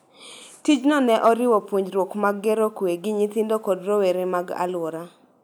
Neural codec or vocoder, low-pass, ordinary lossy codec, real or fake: none; none; none; real